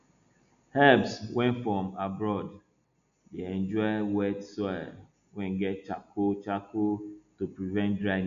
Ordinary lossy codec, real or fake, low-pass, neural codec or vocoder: none; real; 7.2 kHz; none